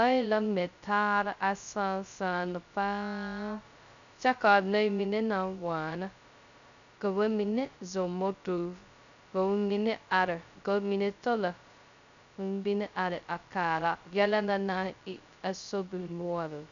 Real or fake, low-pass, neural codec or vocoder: fake; 7.2 kHz; codec, 16 kHz, 0.2 kbps, FocalCodec